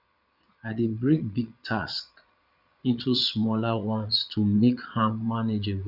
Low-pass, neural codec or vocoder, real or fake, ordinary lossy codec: 5.4 kHz; vocoder, 22.05 kHz, 80 mel bands, Vocos; fake; none